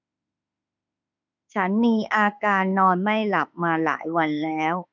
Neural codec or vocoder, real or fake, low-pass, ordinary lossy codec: autoencoder, 48 kHz, 32 numbers a frame, DAC-VAE, trained on Japanese speech; fake; 7.2 kHz; none